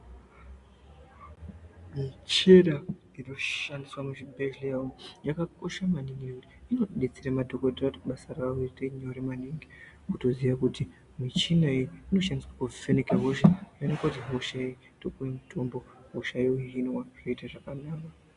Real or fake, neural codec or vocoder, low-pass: real; none; 10.8 kHz